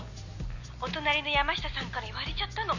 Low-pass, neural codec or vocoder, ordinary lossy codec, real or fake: 7.2 kHz; none; none; real